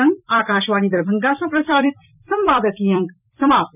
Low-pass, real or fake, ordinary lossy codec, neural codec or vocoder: 3.6 kHz; fake; none; vocoder, 44.1 kHz, 128 mel bands every 256 samples, BigVGAN v2